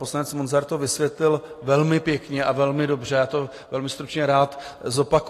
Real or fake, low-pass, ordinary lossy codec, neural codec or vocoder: real; 14.4 kHz; AAC, 48 kbps; none